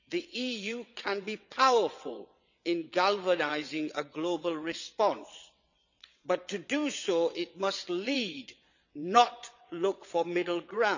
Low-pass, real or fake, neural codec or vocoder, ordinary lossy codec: 7.2 kHz; fake; vocoder, 22.05 kHz, 80 mel bands, WaveNeXt; none